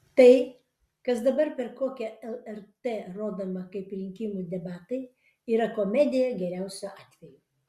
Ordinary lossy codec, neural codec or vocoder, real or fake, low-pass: Opus, 64 kbps; none; real; 14.4 kHz